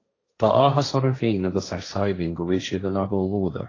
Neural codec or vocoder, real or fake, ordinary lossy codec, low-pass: codec, 16 kHz, 1.1 kbps, Voila-Tokenizer; fake; AAC, 32 kbps; 7.2 kHz